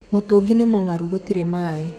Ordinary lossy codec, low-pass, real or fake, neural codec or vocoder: none; 14.4 kHz; fake; codec, 32 kHz, 1.9 kbps, SNAC